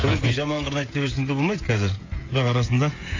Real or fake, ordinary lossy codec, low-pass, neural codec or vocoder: fake; AAC, 32 kbps; 7.2 kHz; codec, 16 kHz, 16 kbps, FreqCodec, smaller model